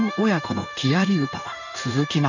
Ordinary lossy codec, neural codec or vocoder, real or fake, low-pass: none; codec, 16 kHz in and 24 kHz out, 1 kbps, XY-Tokenizer; fake; 7.2 kHz